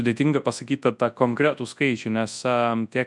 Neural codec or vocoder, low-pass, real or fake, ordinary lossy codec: codec, 24 kHz, 0.9 kbps, WavTokenizer, large speech release; 10.8 kHz; fake; MP3, 96 kbps